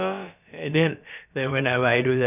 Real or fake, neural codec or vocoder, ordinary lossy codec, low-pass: fake; codec, 16 kHz, about 1 kbps, DyCAST, with the encoder's durations; none; 3.6 kHz